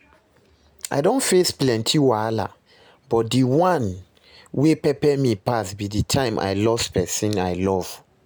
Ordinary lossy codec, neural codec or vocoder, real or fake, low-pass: none; none; real; none